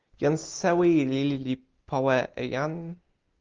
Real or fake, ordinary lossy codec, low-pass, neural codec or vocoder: real; Opus, 32 kbps; 7.2 kHz; none